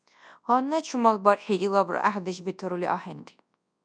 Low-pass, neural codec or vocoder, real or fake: 9.9 kHz; codec, 24 kHz, 0.9 kbps, WavTokenizer, large speech release; fake